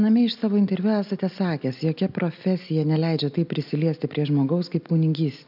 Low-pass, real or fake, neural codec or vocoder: 5.4 kHz; real; none